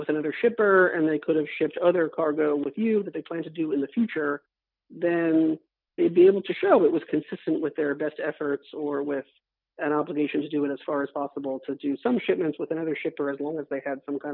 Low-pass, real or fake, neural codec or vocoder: 5.4 kHz; real; none